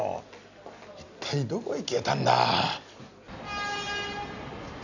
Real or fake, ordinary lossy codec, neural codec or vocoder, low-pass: real; none; none; 7.2 kHz